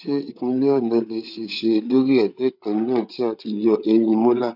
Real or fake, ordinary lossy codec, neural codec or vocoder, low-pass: fake; none; codec, 16 kHz, 8 kbps, FreqCodec, larger model; 5.4 kHz